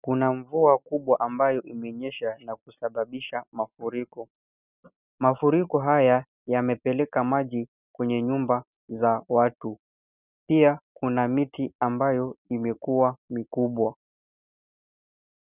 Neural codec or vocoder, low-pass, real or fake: none; 3.6 kHz; real